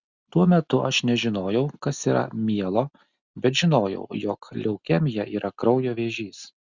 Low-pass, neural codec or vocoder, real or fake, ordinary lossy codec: 7.2 kHz; none; real; Opus, 64 kbps